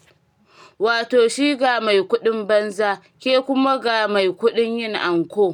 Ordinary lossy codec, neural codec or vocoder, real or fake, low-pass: none; none; real; 19.8 kHz